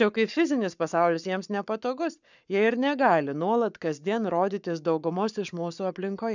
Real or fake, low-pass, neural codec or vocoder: fake; 7.2 kHz; codec, 44.1 kHz, 7.8 kbps, Pupu-Codec